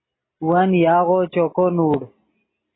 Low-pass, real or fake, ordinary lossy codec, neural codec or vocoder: 7.2 kHz; real; AAC, 16 kbps; none